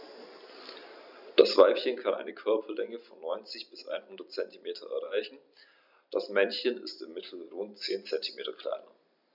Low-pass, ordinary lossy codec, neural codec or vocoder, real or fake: 5.4 kHz; none; none; real